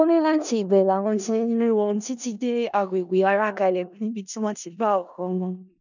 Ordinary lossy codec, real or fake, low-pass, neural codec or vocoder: none; fake; 7.2 kHz; codec, 16 kHz in and 24 kHz out, 0.4 kbps, LongCat-Audio-Codec, four codebook decoder